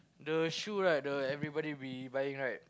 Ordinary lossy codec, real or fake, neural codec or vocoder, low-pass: none; real; none; none